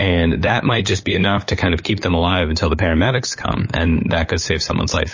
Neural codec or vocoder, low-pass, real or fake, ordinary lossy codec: codec, 16 kHz, 8 kbps, FunCodec, trained on LibriTTS, 25 frames a second; 7.2 kHz; fake; MP3, 32 kbps